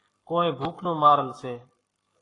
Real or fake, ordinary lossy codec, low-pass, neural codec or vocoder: fake; AAC, 32 kbps; 10.8 kHz; codec, 44.1 kHz, 7.8 kbps, Pupu-Codec